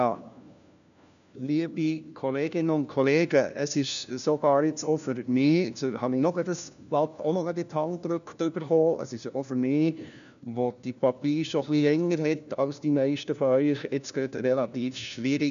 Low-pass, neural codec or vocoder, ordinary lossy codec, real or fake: 7.2 kHz; codec, 16 kHz, 1 kbps, FunCodec, trained on LibriTTS, 50 frames a second; none; fake